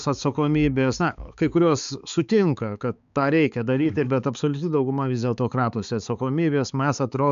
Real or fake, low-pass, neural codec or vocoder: fake; 7.2 kHz; codec, 16 kHz, 4 kbps, X-Codec, HuBERT features, trained on balanced general audio